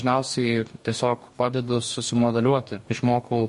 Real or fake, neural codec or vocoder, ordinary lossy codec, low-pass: fake; codec, 44.1 kHz, 2.6 kbps, DAC; MP3, 48 kbps; 14.4 kHz